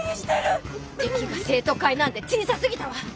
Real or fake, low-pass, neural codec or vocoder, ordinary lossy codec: real; none; none; none